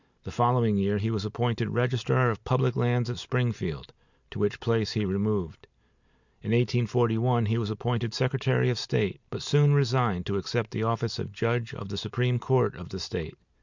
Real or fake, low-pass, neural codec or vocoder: real; 7.2 kHz; none